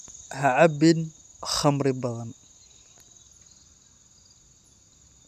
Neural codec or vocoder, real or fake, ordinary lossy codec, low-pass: none; real; none; 14.4 kHz